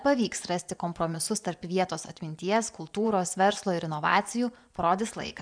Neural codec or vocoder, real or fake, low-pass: vocoder, 24 kHz, 100 mel bands, Vocos; fake; 9.9 kHz